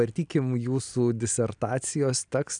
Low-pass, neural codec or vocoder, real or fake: 9.9 kHz; none; real